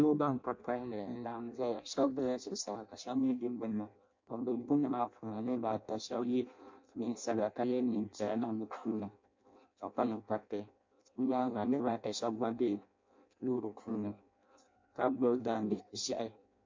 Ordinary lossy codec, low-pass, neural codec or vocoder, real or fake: MP3, 48 kbps; 7.2 kHz; codec, 16 kHz in and 24 kHz out, 0.6 kbps, FireRedTTS-2 codec; fake